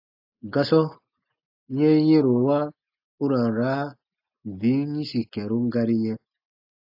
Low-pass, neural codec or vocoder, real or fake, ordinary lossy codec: 5.4 kHz; none; real; AAC, 48 kbps